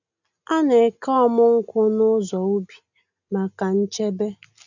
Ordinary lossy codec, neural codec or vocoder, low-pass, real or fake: none; none; 7.2 kHz; real